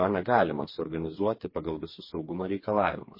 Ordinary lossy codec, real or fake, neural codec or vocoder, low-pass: MP3, 24 kbps; fake; codec, 16 kHz, 4 kbps, FreqCodec, smaller model; 5.4 kHz